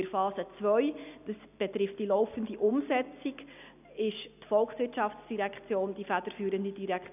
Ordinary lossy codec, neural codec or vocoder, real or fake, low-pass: none; none; real; 3.6 kHz